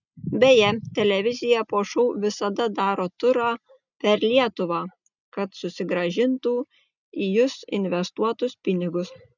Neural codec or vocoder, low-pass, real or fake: none; 7.2 kHz; real